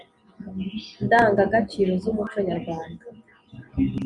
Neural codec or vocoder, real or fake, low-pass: none; real; 10.8 kHz